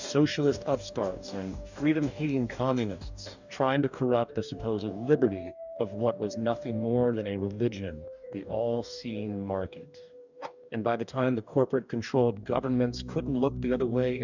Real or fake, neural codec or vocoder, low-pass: fake; codec, 44.1 kHz, 2.6 kbps, DAC; 7.2 kHz